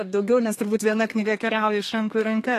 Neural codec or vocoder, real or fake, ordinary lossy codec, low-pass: codec, 32 kHz, 1.9 kbps, SNAC; fake; AAC, 48 kbps; 14.4 kHz